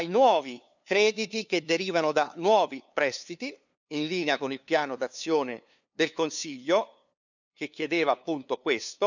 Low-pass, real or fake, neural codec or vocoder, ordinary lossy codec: 7.2 kHz; fake; codec, 16 kHz, 2 kbps, FunCodec, trained on LibriTTS, 25 frames a second; none